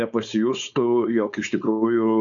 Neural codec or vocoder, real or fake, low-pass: codec, 16 kHz, 4.8 kbps, FACodec; fake; 7.2 kHz